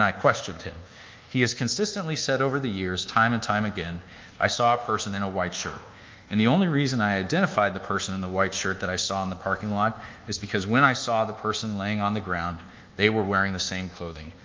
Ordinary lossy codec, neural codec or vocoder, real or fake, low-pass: Opus, 24 kbps; codec, 24 kHz, 1.2 kbps, DualCodec; fake; 7.2 kHz